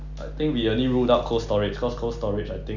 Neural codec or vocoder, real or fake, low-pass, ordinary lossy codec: none; real; 7.2 kHz; none